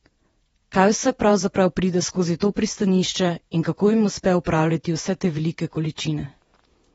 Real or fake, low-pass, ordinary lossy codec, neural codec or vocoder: fake; 19.8 kHz; AAC, 24 kbps; vocoder, 48 kHz, 128 mel bands, Vocos